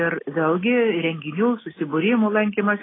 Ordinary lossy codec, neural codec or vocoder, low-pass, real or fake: AAC, 16 kbps; none; 7.2 kHz; real